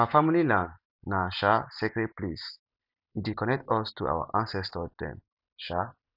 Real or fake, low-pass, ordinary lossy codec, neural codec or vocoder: real; 5.4 kHz; none; none